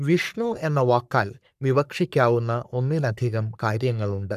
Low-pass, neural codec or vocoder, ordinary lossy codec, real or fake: 14.4 kHz; codec, 44.1 kHz, 3.4 kbps, Pupu-Codec; none; fake